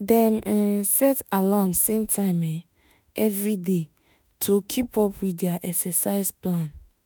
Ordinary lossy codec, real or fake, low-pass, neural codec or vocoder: none; fake; none; autoencoder, 48 kHz, 32 numbers a frame, DAC-VAE, trained on Japanese speech